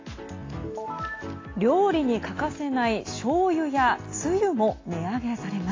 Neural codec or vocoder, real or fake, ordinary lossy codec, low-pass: none; real; AAC, 32 kbps; 7.2 kHz